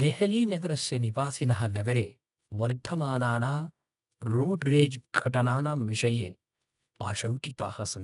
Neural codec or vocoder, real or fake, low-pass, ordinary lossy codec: codec, 24 kHz, 0.9 kbps, WavTokenizer, medium music audio release; fake; 10.8 kHz; none